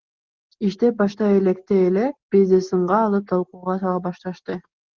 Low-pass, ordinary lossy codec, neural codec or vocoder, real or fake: 7.2 kHz; Opus, 16 kbps; none; real